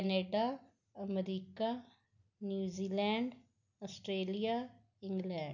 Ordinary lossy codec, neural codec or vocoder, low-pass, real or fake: none; none; 7.2 kHz; real